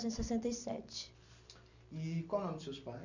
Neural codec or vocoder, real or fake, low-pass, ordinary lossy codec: none; real; 7.2 kHz; none